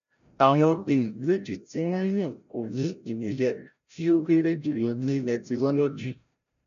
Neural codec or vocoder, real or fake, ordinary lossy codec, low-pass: codec, 16 kHz, 0.5 kbps, FreqCodec, larger model; fake; none; 7.2 kHz